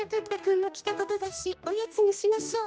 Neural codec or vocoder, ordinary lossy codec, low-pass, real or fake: codec, 16 kHz, 1 kbps, X-Codec, HuBERT features, trained on general audio; none; none; fake